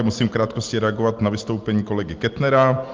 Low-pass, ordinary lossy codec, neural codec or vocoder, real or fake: 7.2 kHz; Opus, 32 kbps; none; real